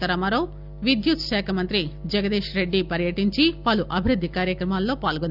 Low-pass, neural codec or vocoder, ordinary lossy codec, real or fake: 5.4 kHz; none; none; real